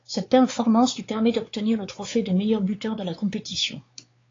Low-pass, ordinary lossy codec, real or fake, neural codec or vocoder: 7.2 kHz; AAC, 32 kbps; fake; codec, 16 kHz, 4 kbps, X-Codec, WavLM features, trained on Multilingual LibriSpeech